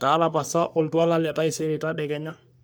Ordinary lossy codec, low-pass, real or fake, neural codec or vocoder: none; none; fake; codec, 44.1 kHz, 3.4 kbps, Pupu-Codec